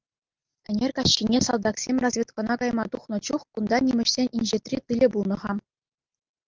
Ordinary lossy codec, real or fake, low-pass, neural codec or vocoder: Opus, 32 kbps; real; 7.2 kHz; none